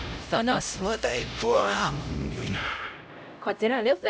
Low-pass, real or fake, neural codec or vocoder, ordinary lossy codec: none; fake; codec, 16 kHz, 0.5 kbps, X-Codec, HuBERT features, trained on LibriSpeech; none